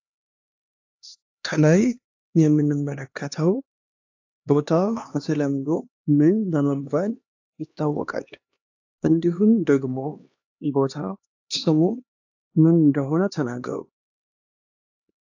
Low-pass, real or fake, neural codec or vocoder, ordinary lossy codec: 7.2 kHz; fake; codec, 16 kHz, 2 kbps, X-Codec, HuBERT features, trained on LibriSpeech; AAC, 48 kbps